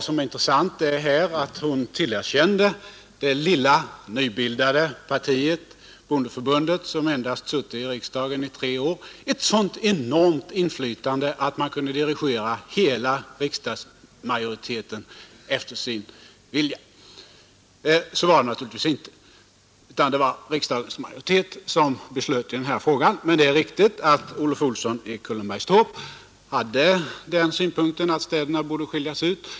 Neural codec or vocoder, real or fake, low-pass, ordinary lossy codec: none; real; none; none